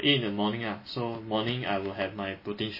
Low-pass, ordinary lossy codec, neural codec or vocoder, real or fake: 5.4 kHz; MP3, 24 kbps; none; real